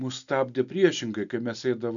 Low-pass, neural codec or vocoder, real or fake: 7.2 kHz; none; real